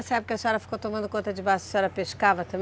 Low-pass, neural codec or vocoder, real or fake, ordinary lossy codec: none; none; real; none